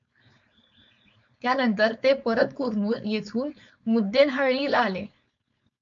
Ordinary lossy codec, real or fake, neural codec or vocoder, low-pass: AAC, 64 kbps; fake; codec, 16 kHz, 4.8 kbps, FACodec; 7.2 kHz